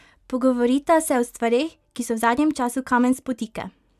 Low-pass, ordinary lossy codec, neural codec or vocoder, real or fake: 14.4 kHz; none; none; real